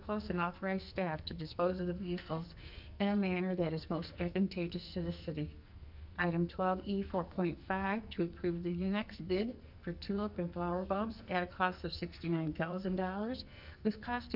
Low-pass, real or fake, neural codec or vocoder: 5.4 kHz; fake; codec, 44.1 kHz, 2.6 kbps, SNAC